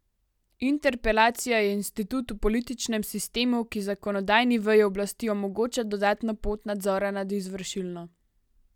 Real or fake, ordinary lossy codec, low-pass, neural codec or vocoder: real; none; 19.8 kHz; none